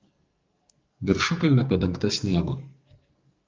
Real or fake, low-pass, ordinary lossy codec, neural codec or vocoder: fake; 7.2 kHz; Opus, 24 kbps; codec, 44.1 kHz, 2.6 kbps, SNAC